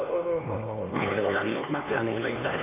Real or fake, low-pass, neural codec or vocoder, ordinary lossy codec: fake; 3.6 kHz; codec, 16 kHz, 2 kbps, X-Codec, HuBERT features, trained on LibriSpeech; MP3, 24 kbps